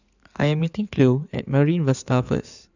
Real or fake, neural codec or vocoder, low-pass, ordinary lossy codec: fake; codec, 16 kHz in and 24 kHz out, 2.2 kbps, FireRedTTS-2 codec; 7.2 kHz; none